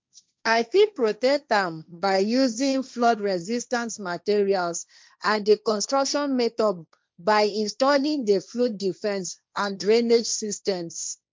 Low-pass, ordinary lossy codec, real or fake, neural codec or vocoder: none; none; fake; codec, 16 kHz, 1.1 kbps, Voila-Tokenizer